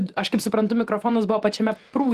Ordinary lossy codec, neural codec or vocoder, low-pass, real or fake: Opus, 24 kbps; vocoder, 48 kHz, 128 mel bands, Vocos; 14.4 kHz; fake